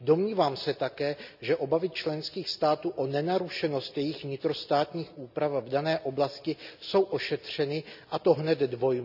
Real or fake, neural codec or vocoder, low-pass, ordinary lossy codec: real; none; 5.4 kHz; none